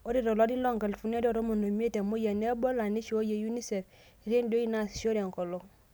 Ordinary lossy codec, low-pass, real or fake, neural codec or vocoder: none; none; real; none